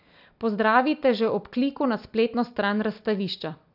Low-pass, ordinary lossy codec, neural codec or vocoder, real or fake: 5.4 kHz; none; none; real